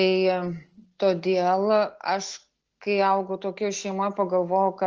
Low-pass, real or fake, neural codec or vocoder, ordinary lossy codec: 7.2 kHz; real; none; Opus, 16 kbps